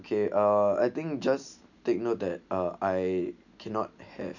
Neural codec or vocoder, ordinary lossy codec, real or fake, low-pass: none; none; real; 7.2 kHz